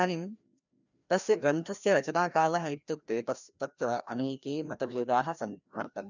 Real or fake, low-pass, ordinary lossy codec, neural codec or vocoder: fake; 7.2 kHz; none; codec, 16 kHz, 1 kbps, FreqCodec, larger model